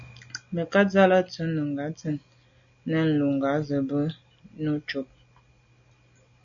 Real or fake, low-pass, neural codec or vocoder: real; 7.2 kHz; none